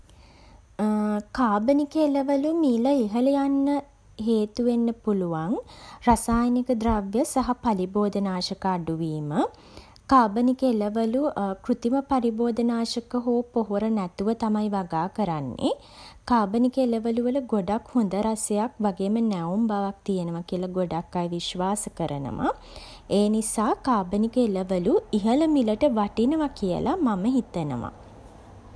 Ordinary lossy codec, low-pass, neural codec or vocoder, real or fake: none; none; none; real